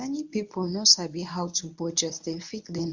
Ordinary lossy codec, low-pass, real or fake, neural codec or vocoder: Opus, 64 kbps; 7.2 kHz; fake; codec, 24 kHz, 0.9 kbps, WavTokenizer, medium speech release version 1